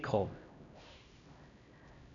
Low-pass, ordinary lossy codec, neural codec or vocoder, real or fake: 7.2 kHz; none; codec, 16 kHz, 1 kbps, X-Codec, HuBERT features, trained on LibriSpeech; fake